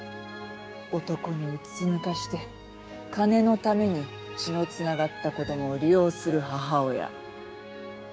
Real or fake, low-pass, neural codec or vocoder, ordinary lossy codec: fake; none; codec, 16 kHz, 6 kbps, DAC; none